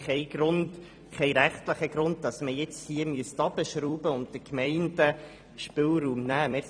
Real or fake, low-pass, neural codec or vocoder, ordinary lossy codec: fake; 9.9 kHz; vocoder, 44.1 kHz, 128 mel bands every 256 samples, BigVGAN v2; none